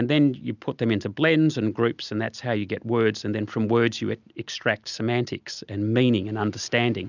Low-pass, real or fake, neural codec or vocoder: 7.2 kHz; real; none